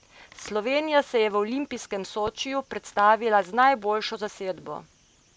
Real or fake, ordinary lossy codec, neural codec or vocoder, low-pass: real; none; none; none